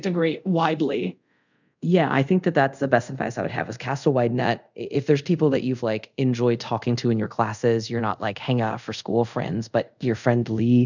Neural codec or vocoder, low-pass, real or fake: codec, 24 kHz, 0.5 kbps, DualCodec; 7.2 kHz; fake